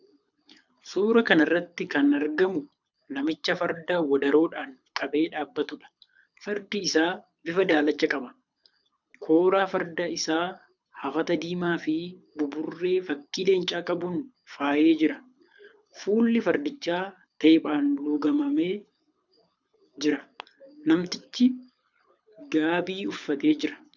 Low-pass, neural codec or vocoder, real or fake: 7.2 kHz; codec, 24 kHz, 6 kbps, HILCodec; fake